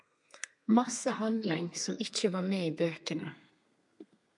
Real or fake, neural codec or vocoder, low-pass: fake; codec, 32 kHz, 1.9 kbps, SNAC; 10.8 kHz